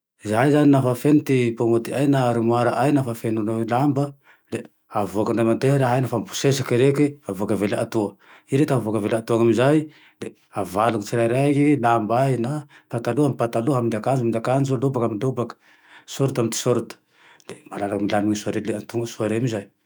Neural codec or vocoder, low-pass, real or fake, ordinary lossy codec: autoencoder, 48 kHz, 128 numbers a frame, DAC-VAE, trained on Japanese speech; none; fake; none